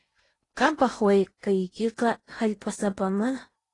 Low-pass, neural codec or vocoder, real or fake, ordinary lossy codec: 10.8 kHz; codec, 16 kHz in and 24 kHz out, 0.8 kbps, FocalCodec, streaming, 65536 codes; fake; AAC, 32 kbps